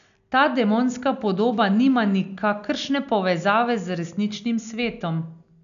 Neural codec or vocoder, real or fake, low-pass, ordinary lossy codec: none; real; 7.2 kHz; none